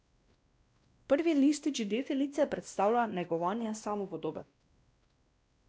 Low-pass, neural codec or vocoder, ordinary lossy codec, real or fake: none; codec, 16 kHz, 1 kbps, X-Codec, WavLM features, trained on Multilingual LibriSpeech; none; fake